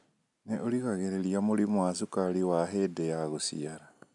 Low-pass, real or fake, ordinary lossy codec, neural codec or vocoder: 10.8 kHz; real; none; none